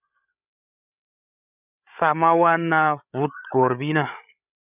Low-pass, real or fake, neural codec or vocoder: 3.6 kHz; fake; codec, 16 kHz, 8 kbps, FreqCodec, larger model